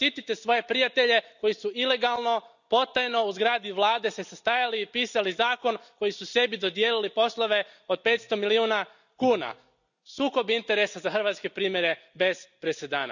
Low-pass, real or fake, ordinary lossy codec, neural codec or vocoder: 7.2 kHz; real; none; none